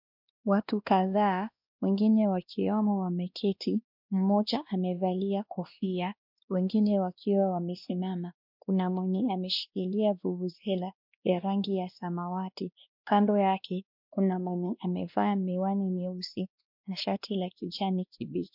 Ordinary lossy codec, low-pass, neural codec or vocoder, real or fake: MP3, 48 kbps; 5.4 kHz; codec, 16 kHz, 1 kbps, X-Codec, WavLM features, trained on Multilingual LibriSpeech; fake